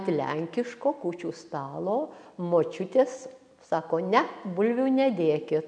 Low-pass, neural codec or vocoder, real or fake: 9.9 kHz; none; real